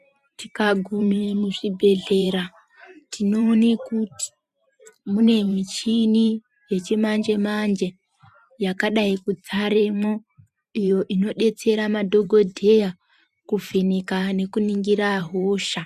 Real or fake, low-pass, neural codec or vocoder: fake; 14.4 kHz; vocoder, 44.1 kHz, 128 mel bands every 512 samples, BigVGAN v2